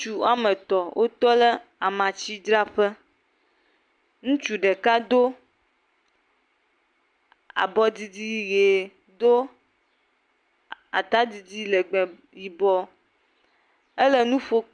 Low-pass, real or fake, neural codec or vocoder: 9.9 kHz; real; none